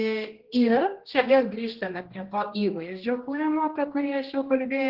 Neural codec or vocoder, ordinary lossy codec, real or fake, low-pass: codec, 16 kHz, 1 kbps, X-Codec, HuBERT features, trained on general audio; Opus, 16 kbps; fake; 5.4 kHz